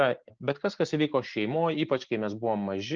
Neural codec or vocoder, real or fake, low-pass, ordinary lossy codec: none; real; 7.2 kHz; Opus, 24 kbps